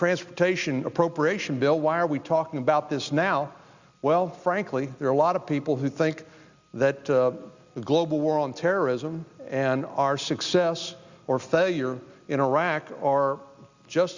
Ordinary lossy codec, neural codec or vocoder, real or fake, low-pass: Opus, 64 kbps; none; real; 7.2 kHz